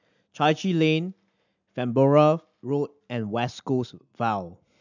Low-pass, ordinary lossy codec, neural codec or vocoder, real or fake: 7.2 kHz; none; none; real